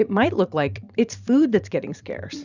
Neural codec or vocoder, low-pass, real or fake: vocoder, 44.1 kHz, 128 mel bands every 512 samples, BigVGAN v2; 7.2 kHz; fake